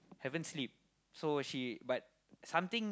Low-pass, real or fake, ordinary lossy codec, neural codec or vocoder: none; real; none; none